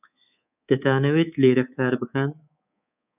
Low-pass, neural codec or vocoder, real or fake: 3.6 kHz; codec, 24 kHz, 3.1 kbps, DualCodec; fake